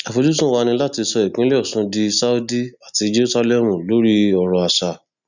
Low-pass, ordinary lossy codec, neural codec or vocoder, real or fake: 7.2 kHz; none; none; real